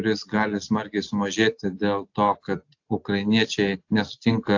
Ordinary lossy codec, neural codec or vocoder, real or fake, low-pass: AAC, 48 kbps; none; real; 7.2 kHz